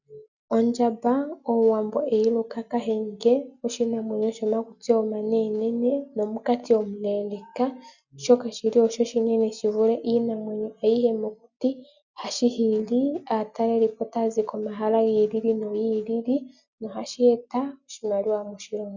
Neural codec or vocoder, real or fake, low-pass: none; real; 7.2 kHz